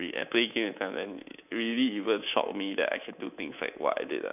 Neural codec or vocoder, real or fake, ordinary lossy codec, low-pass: codec, 24 kHz, 3.1 kbps, DualCodec; fake; none; 3.6 kHz